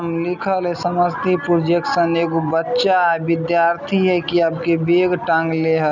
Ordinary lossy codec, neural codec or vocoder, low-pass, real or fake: none; none; 7.2 kHz; real